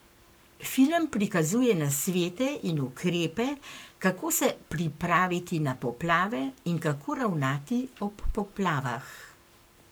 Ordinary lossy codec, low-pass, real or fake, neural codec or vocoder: none; none; fake; codec, 44.1 kHz, 7.8 kbps, Pupu-Codec